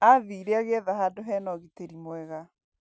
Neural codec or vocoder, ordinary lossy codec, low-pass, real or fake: none; none; none; real